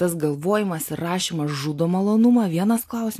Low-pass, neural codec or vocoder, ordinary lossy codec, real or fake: 14.4 kHz; none; AAC, 64 kbps; real